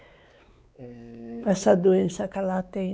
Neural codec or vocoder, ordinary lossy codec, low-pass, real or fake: codec, 16 kHz, 4 kbps, X-Codec, WavLM features, trained on Multilingual LibriSpeech; none; none; fake